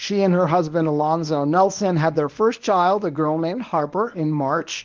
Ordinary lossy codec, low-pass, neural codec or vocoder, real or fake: Opus, 32 kbps; 7.2 kHz; codec, 24 kHz, 0.9 kbps, WavTokenizer, medium speech release version 1; fake